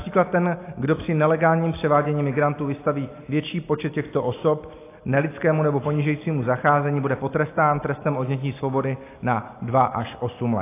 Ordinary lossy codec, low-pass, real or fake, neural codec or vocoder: AAC, 24 kbps; 3.6 kHz; real; none